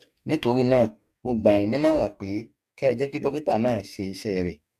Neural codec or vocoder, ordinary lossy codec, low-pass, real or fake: codec, 44.1 kHz, 2.6 kbps, DAC; none; 14.4 kHz; fake